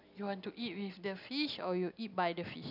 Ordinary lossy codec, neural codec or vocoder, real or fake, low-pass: none; none; real; 5.4 kHz